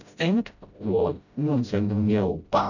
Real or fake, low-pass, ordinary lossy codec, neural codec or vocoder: fake; 7.2 kHz; none; codec, 16 kHz, 0.5 kbps, FreqCodec, smaller model